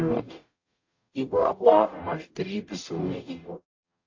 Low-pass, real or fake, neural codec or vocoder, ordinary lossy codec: 7.2 kHz; fake; codec, 44.1 kHz, 0.9 kbps, DAC; AAC, 48 kbps